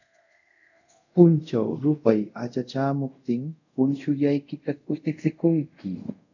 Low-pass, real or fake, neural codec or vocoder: 7.2 kHz; fake; codec, 24 kHz, 0.5 kbps, DualCodec